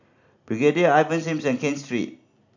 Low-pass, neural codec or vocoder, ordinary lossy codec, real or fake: 7.2 kHz; none; none; real